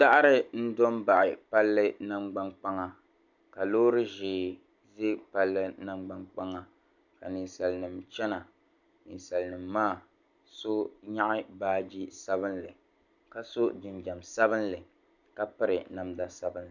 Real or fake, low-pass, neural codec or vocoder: real; 7.2 kHz; none